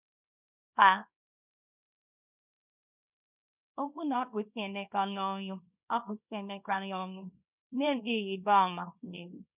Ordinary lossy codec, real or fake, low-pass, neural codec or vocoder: none; fake; 3.6 kHz; codec, 24 kHz, 0.9 kbps, WavTokenizer, small release